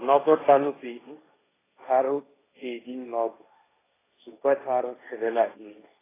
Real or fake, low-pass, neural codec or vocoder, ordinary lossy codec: fake; 3.6 kHz; codec, 16 kHz, 1.1 kbps, Voila-Tokenizer; AAC, 16 kbps